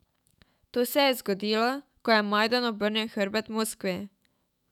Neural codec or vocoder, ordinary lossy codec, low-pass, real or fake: autoencoder, 48 kHz, 128 numbers a frame, DAC-VAE, trained on Japanese speech; none; 19.8 kHz; fake